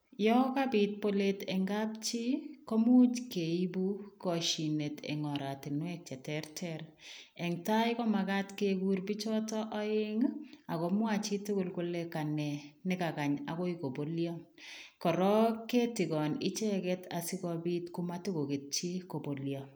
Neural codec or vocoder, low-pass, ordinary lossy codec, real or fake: none; none; none; real